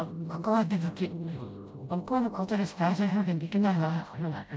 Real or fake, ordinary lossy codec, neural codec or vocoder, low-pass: fake; none; codec, 16 kHz, 0.5 kbps, FreqCodec, smaller model; none